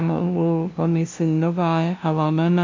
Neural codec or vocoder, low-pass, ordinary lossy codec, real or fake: codec, 16 kHz, 0.5 kbps, FunCodec, trained on LibriTTS, 25 frames a second; 7.2 kHz; MP3, 64 kbps; fake